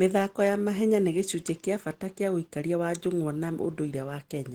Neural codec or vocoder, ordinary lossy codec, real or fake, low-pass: none; Opus, 16 kbps; real; 19.8 kHz